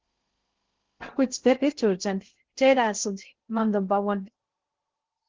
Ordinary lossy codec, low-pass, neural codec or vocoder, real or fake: Opus, 16 kbps; 7.2 kHz; codec, 16 kHz in and 24 kHz out, 0.6 kbps, FocalCodec, streaming, 2048 codes; fake